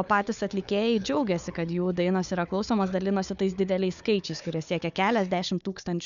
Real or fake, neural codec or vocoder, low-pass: fake; codec, 16 kHz, 4 kbps, FunCodec, trained on Chinese and English, 50 frames a second; 7.2 kHz